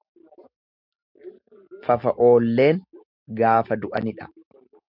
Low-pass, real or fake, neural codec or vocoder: 5.4 kHz; real; none